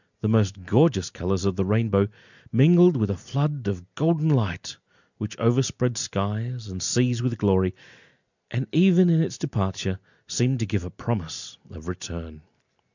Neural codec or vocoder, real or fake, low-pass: none; real; 7.2 kHz